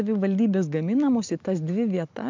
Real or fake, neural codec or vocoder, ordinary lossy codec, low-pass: real; none; MP3, 64 kbps; 7.2 kHz